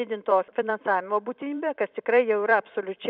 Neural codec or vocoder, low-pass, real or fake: vocoder, 44.1 kHz, 128 mel bands every 256 samples, BigVGAN v2; 5.4 kHz; fake